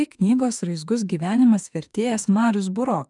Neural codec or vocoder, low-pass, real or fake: autoencoder, 48 kHz, 32 numbers a frame, DAC-VAE, trained on Japanese speech; 10.8 kHz; fake